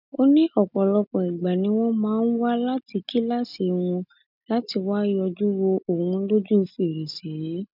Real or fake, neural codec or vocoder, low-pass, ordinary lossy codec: real; none; 5.4 kHz; none